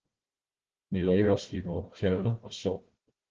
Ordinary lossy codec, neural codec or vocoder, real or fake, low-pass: Opus, 16 kbps; codec, 16 kHz, 1 kbps, FunCodec, trained on Chinese and English, 50 frames a second; fake; 7.2 kHz